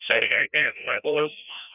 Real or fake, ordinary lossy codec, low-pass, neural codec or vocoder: fake; none; 3.6 kHz; codec, 16 kHz, 1 kbps, FreqCodec, larger model